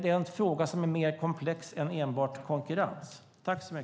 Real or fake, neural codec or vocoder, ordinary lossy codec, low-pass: real; none; none; none